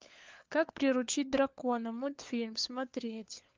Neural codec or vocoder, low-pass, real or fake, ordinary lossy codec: codec, 16 kHz, 4 kbps, FunCodec, trained on LibriTTS, 50 frames a second; 7.2 kHz; fake; Opus, 32 kbps